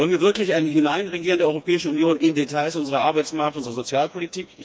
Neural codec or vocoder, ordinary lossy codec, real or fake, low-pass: codec, 16 kHz, 2 kbps, FreqCodec, smaller model; none; fake; none